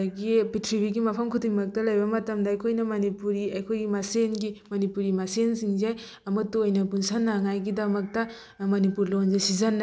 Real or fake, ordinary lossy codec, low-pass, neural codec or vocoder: real; none; none; none